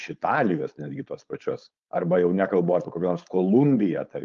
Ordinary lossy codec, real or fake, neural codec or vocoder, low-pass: Opus, 32 kbps; fake; codec, 16 kHz, 4.8 kbps, FACodec; 7.2 kHz